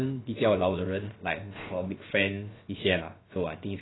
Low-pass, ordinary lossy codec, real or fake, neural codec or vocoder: 7.2 kHz; AAC, 16 kbps; fake; codec, 16 kHz, about 1 kbps, DyCAST, with the encoder's durations